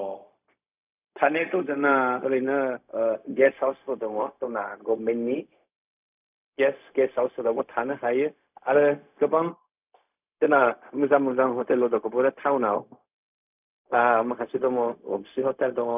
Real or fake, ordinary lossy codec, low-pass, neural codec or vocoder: fake; none; 3.6 kHz; codec, 16 kHz, 0.4 kbps, LongCat-Audio-Codec